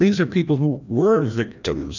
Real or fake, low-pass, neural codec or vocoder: fake; 7.2 kHz; codec, 16 kHz, 1 kbps, FreqCodec, larger model